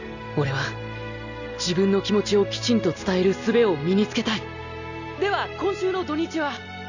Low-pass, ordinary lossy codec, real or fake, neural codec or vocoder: 7.2 kHz; none; real; none